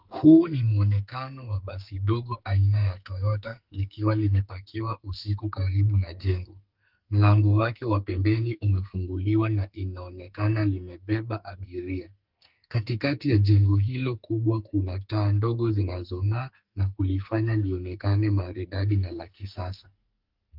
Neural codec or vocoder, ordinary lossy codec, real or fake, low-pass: codec, 44.1 kHz, 2.6 kbps, SNAC; Opus, 32 kbps; fake; 5.4 kHz